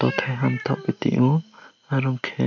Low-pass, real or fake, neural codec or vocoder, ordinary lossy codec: 7.2 kHz; real; none; none